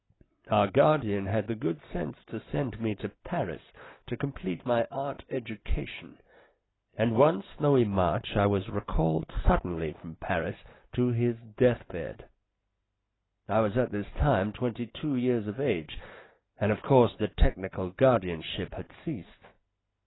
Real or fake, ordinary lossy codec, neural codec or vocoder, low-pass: real; AAC, 16 kbps; none; 7.2 kHz